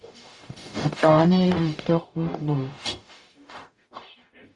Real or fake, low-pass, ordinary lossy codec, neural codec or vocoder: fake; 10.8 kHz; Opus, 64 kbps; codec, 44.1 kHz, 0.9 kbps, DAC